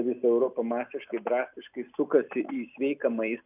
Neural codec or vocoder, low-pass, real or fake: none; 3.6 kHz; real